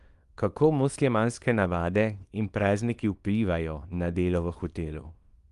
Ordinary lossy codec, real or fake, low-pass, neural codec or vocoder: Opus, 32 kbps; fake; 10.8 kHz; codec, 24 kHz, 0.9 kbps, WavTokenizer, small release